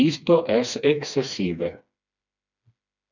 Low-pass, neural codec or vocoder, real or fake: 7.2 kHz; codec, 16 kHz, 2 kbps, FreqCodec, smaller model; fake